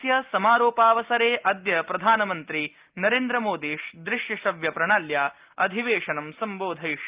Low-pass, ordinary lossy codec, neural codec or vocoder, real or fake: 3.6 kHz; Opus, 32 kbps; none; real